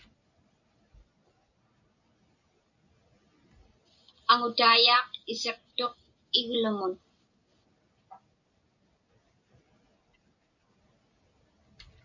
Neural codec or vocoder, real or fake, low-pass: none; real; 7.2 kHz